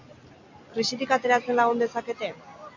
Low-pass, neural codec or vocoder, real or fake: 7.2 kHz; none; real